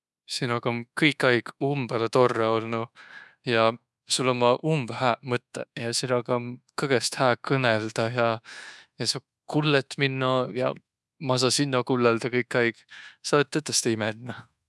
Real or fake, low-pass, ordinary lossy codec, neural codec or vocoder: fake; none; none; codec, 24 kHz, 1.2 kbps, DualCodec